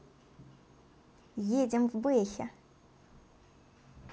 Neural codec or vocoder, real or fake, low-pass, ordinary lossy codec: none; real; none; none